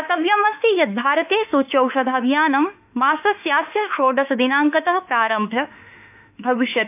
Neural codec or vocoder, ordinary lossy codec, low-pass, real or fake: autoencoder, 48 kHz, 32 numbers a frame, DAC-VAE, trained on Japanese speech; none; 3.6 kHz; fake